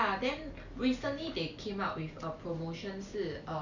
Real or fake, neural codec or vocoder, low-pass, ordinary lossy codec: real; none; 7.2 kHz; none